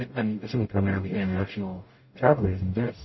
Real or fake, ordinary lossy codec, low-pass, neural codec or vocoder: fake; MP3, 24 kbps; 7.2 kHz; codec, 44.1 kHz, 0.9 kbps, DAC